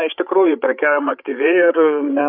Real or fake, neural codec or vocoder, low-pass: fake; codec, 16 kHz, 8 kbps, FreqCodec, larger model; 5.4 kHz